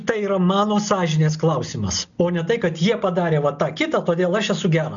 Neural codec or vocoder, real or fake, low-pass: none; real; 7.2 kHz